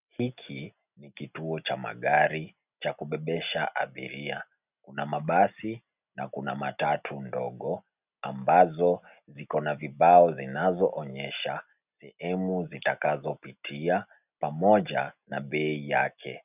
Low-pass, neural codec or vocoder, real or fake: 3.6 kHz; none; real